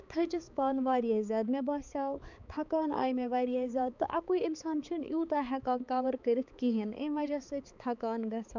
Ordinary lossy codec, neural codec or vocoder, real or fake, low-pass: none; codec, 16 kHz, 4 kbps, X-Codec, HuBERT features, trained on balanced general audio; fake; 7.2 kHz